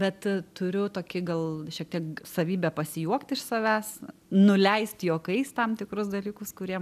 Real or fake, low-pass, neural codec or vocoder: real; 14.4 kHz; none